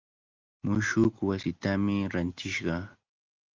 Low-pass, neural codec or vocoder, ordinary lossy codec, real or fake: 7.2 kHz; none; Opus, 24 kbps; real